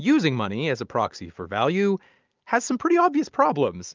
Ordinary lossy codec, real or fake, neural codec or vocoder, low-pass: Opus, 24 kbps; real; none; 7.2 kHz